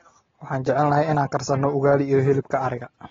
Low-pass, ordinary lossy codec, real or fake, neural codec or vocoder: 7.2 kHz; AAC, 24 kbps; real; none